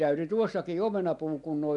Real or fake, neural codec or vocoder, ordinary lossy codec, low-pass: real; none; none; 10.8 kHz